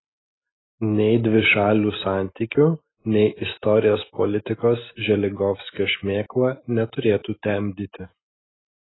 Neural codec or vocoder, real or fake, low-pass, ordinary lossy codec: none; real; 7.2 kHz; AAC, 16 kbps